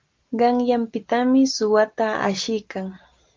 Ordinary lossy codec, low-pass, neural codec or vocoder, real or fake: Opus, 32 kbps; 7.2 kHz; none; real